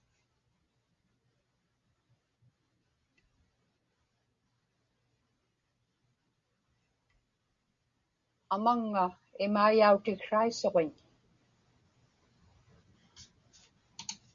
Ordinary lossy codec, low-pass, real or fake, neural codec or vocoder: Opus, 64 kbps; 7.2 kHz; real; none